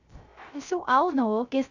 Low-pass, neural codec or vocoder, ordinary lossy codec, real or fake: 7.2 kHz; codec, 16 kHz, 0.3 kbps, FocalCodec; none; fake